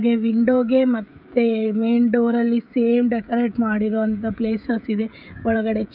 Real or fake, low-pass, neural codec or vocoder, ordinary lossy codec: fake; 5.4 kHz; codec, 16 kHz, 16 kbps, FreqCodec, smaller model; none